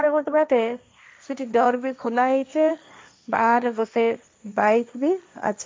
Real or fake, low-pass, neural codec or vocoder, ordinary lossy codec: fake; none; codec, 16 kHz, 1.1 kbps, Voila-Tokenizer; none